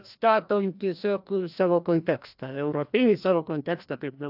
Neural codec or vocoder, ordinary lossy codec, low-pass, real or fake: codec, 16 kHz, 1 kbps, FreqCodec, larger model; AAC, 48 kbps; 5.4 kHz; fake